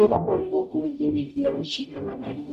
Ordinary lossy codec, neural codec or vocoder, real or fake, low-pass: none; codec, 44.1 kHz, 0.9 kbps, DAC; fake; 14.4 kHz